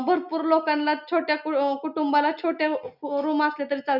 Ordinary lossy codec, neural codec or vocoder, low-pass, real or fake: none; none; 5.4 kHz; real